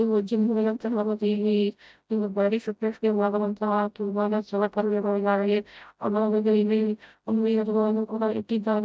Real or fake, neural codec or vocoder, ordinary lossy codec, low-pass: fake; codec, 16 kHz, 0.5 kbps, FreqCodec, smaller model; none; none